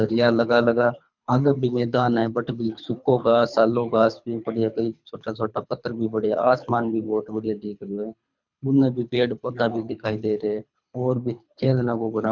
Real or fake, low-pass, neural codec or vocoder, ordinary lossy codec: fake; 7.2 kHz; codec, 24 kHz, 3 kbps, HILCodec; none